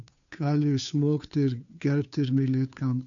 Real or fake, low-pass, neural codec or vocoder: fake; 7.2 kHz; codec, 16 kHz, 2 kbps, FunCodec, trained on Chinese and English, 25 frames a second